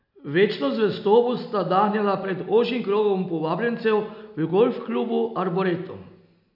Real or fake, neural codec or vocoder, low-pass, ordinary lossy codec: real; none; 5.4 kHz; none